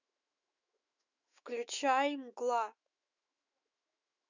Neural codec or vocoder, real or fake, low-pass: autoencoder, 48 kHz, 128 numbers a frame, DAC-VAE, trained on Japanese speech; fake; 7.2 kHz